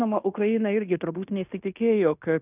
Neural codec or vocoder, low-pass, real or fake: codec, 16 kHz in and 24 kHz out, 0.9 kbps, LongCat-Audio-Codec, fine tuned four codebook decoder; 3.6 kHz; fake